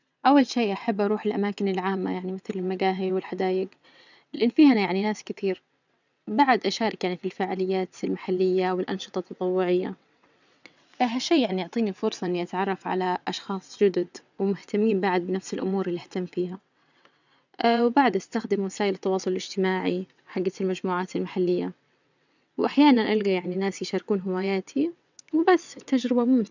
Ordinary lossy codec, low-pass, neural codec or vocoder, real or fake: none; 7.2 kHz; vocoder, 22.05 kHz, 80 mel bands, WaveNeXt; fake